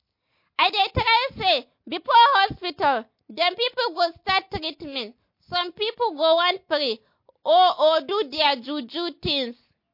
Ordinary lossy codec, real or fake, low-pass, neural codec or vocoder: MP3, 32 kbps; real; 5.4 kHz; none